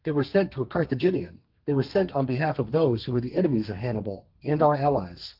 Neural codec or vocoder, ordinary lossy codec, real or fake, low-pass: codec, 32 kHz, 1.9 kbps, SNAC; Opus, 32 kbps; fake; 5.4 kHz